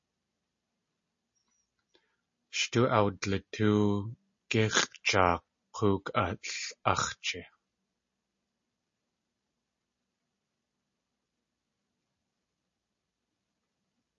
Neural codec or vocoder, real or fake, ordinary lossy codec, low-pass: none; real; MP3, 32 kbps; 7.2 kHz